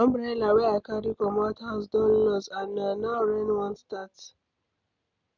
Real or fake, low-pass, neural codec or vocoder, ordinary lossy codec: real; 7.2 kHz; none; none